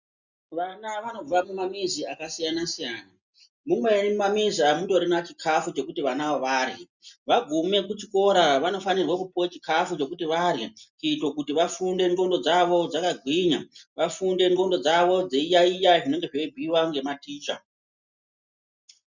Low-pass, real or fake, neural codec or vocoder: 7.2 kHz; real; none